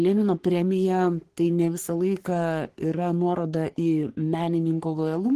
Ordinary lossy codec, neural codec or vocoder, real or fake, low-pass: Opus, 16 kbps; codec, 44.1 kHz, 3.4 kbps, Pupu-Codec; fake; 14.4 kHz